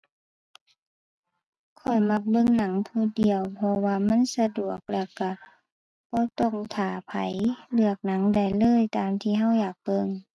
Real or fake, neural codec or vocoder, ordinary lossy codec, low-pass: real; none; none; none